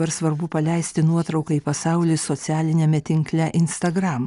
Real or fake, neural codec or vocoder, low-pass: real; none; 10.8 kHz